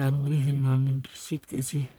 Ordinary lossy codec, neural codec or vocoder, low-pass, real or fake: none; codec, 44.1 kHz, 1.7 kbps, Pupu-Codec; none; fake